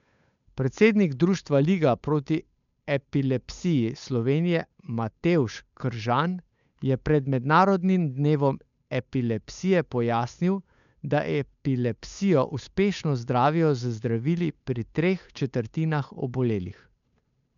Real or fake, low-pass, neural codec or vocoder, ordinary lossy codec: fake; 7.2 kHz; codec, 16 kHz, 8 kbps, FunCodec, trained on Chinese and English, 25 frames a second; none